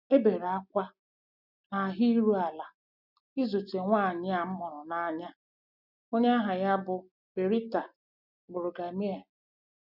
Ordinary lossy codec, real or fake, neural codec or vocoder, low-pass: none; real; none; 5.4 kHz